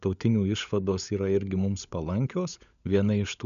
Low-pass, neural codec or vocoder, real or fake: 7.2 kHz; codec, 16 kHz, 16 kbps, FunCodec, trained on LibriTTS, 50 frames a second; fake